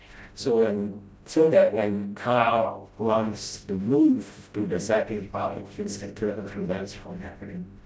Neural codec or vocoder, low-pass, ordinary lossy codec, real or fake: codec, 16 kHz, 0.5 kbps, FreqCodec, smaller model; none; none; fake